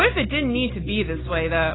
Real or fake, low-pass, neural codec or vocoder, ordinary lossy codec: real; 7.2 kHz; none; AAC, 16 kbps